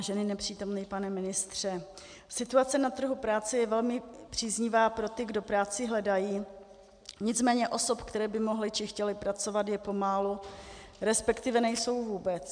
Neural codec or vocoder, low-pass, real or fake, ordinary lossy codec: none; 9.9 kHz; real; MP3, 96 kbps